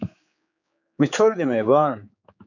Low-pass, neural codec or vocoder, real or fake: 7.2 kHz; codec, 16 kHz, 4 kbps, X-Codec, HuBERT features, trained on general audio; fake